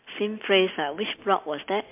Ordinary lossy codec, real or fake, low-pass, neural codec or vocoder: MP3, 32 kbps; real; 3.6 kHz; none